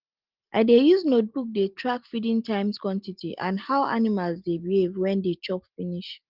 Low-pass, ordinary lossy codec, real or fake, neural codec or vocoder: 5.4 kHz; Opus, 24 kbps; real; none